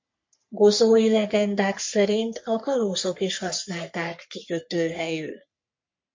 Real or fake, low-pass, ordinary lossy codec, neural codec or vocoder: fake; 7.2 kHz; MP3, 48 kbps; codec, 44.1 kHz, 3.4 kbps, Pupu-Codec